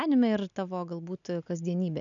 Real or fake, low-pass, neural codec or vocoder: real; 7.2 kHz; none